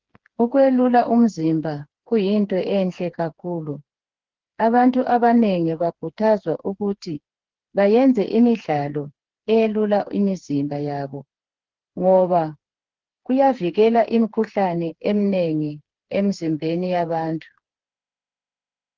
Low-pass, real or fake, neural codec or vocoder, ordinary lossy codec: 7.2 kHz; fake; codec, 16 kHz, 4 kbps, FreqCodec, smaller model; Opus, 16 kbps